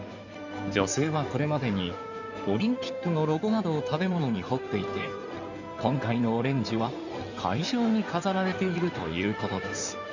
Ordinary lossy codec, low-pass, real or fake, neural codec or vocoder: none; 7.2 kHz; fake; codec, 16 kHz in and 24 kHz out, 2.2 kbps, FireRedTTS-2 codec